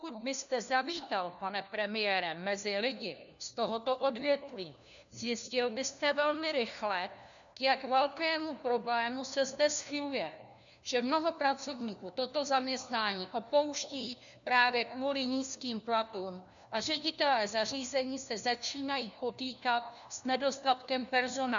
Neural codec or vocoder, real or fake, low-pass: codec, 16 kHz, 1 kbps, FunCodec, trained on LibriTTS, 50 frames a second; fake; 7.2 kHz